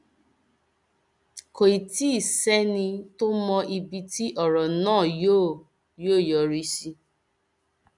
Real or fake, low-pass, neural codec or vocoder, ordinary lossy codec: real; 10.8 kHz; none; none